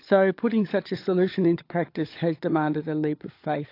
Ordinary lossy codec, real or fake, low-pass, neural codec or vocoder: AAC, 32 kbps; fake; 5.4 kHz; codec, 16 kHz, 16 kbps, FunCodec, trained on LibriTTS, 50 frames a second